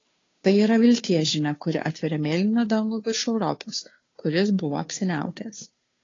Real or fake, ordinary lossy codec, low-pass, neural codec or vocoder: fake; AAC, 32 kbps; 7.2 kHz; codec, 16 kHz, 2 kbps, FunCodec, trained on Chinese and English, 25 frames a second